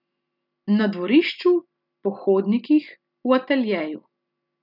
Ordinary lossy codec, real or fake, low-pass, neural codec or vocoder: none; real; 5.4 kHz; none